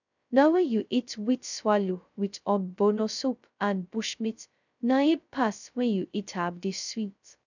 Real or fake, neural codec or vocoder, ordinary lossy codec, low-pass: fake; codec, 16 kHz, 0.2 kbps, FocalCodec; none; 7.2 kHz